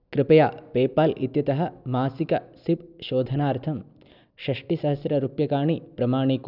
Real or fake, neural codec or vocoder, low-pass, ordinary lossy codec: real; none; 5.4 kHz; none